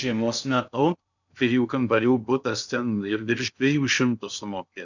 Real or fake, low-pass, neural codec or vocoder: fake; 7.2 kHz; codec, 16 kHz in and 24 kHz out, 0.6 kbps, FocalCodec, streaming, 2048 codes